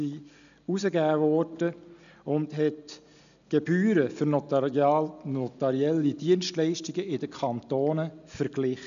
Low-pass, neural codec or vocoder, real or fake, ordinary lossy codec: 7.2 kHz; none; real; MP3, 96 kbps